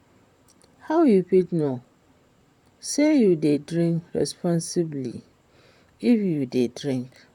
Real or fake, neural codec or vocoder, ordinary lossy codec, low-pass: fake; vocoder, 44.1 kHz, 128 mel bands, Pupu-Vocoder; none; 19.8 kHz